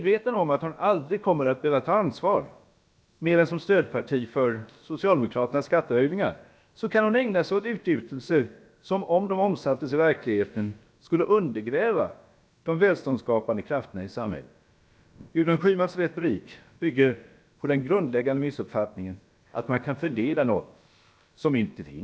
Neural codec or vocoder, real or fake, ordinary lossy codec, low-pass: codec, 16 kHz, about 1 kbps, DyCAST, with the encoder's durations; fake; none; none